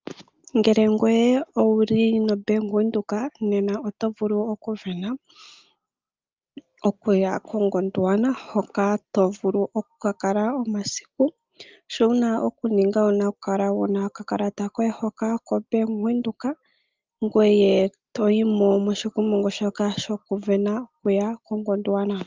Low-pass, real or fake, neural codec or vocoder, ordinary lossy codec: 7.2 kHz; real; none; Opus, 24 kbps